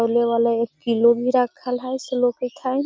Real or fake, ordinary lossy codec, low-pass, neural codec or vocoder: real; none; none; none